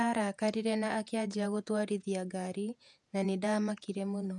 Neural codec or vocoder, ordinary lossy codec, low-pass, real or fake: vocoder, 48 kHz, 128 mel bands, Vocos; none; 10.8 kHz; fake